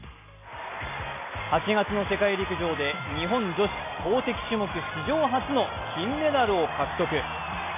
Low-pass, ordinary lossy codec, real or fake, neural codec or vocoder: 3.6 kHz; MP3, 24 kbps; real; none